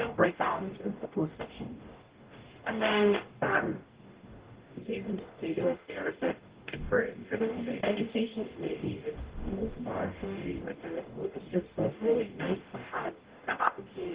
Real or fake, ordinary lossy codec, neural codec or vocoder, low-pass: fake; Opus, 16 kbps; codec, 44.1 kHz, 0.9 kbps, DAC; 3.6 kHz